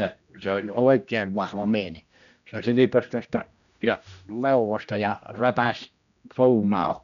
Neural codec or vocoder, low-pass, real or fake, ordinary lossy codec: codec, 16 kHz, 1 kbps, X-Codec, HuBERT features, trained on general audio; 7.2 kHz; fake; none